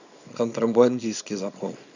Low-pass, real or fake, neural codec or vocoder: 7.2 kHz; fake; codec, 24 kHz, 0.9 kbps, WavTokenizer, small release